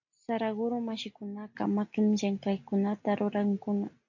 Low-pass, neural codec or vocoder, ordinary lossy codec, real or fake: 7.2 kHz; none; AAC, 48 kbps; real